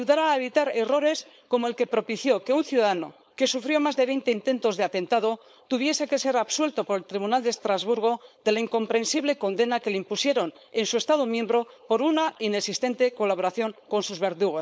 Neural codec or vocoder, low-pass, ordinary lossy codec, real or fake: codec, 16 kHz, 4.8 kbps, FACodec; none; none; fake